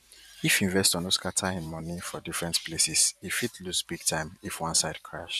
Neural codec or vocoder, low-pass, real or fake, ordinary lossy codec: vocoder, 44.1 kHz, 128 mel bands every 512 samples, BigVGAN v2; 14.4 kHz; fake; none